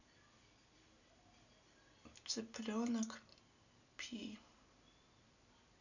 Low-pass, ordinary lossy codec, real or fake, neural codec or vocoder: 7.2 kHz; none; real; none